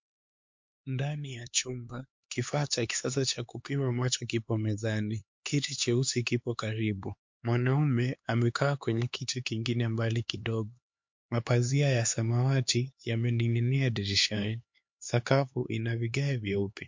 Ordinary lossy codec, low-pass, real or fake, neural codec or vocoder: MP3, 48 kbps; 7.2 kHz; fake; codec, 16 kHz, 4 kbps, X-Codec, HuBERT features, trained on LibriSpeech